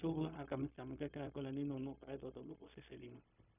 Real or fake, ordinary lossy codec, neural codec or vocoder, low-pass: fake; none; codec, 16 kHz, 0.4 kbps, LongCat-Audio-Codec; 3.6 kHz